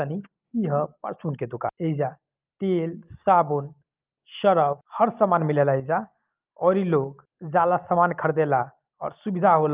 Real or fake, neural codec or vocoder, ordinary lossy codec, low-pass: real; none; Opus, 32 kbps; 3.6 kHz